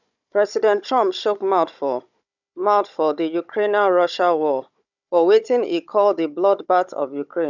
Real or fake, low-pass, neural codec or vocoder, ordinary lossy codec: fake; 7.2 kHz; codec, 16 kHz, 16 kbps, FunCodec, trained on Chinese and English, 50 frames a second; none